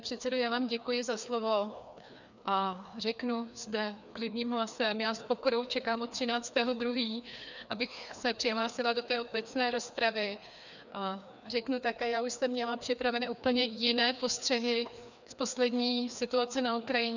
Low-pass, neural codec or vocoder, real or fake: 7.2 kHz; codec, 16 kHz, 2 kbps, FreqCodec, larger model; fake